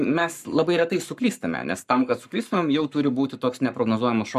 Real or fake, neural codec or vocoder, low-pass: fake; codec, 44.1 kHz, 7.8 kbps, Pupu-Codec; 14.4 kHz